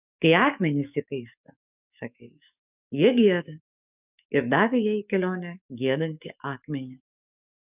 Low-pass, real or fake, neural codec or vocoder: 3.6 kHz; fake; codec, 44.1 kHz, 7.8 kbps, DAC